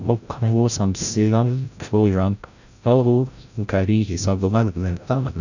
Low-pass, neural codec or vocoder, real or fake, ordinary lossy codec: 7.2 kHz; codec, 16 kHz, 0.5 kbps, FreqCodec, larger model; fake; none